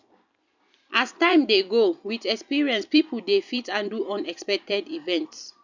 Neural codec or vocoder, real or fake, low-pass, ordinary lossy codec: vocoder, 44.1 kHz, 128 mel bands every 256 samples, BigVGAN v2; fake; 7.2 kHz; none